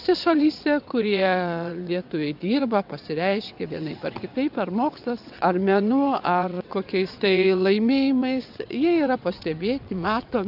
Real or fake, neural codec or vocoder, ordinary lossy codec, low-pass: fake; vocoder, 22.05 kHz, 80 mel bands, WaveNeXt; AAC, 48 kbps; 5.4 kHz